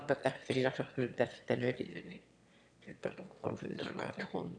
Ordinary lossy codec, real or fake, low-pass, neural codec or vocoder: none; fake; 9.9 kHz; autoencoder, 22.05 kHz, a latent of 192 numbers a frame, VITS, trained on one speaker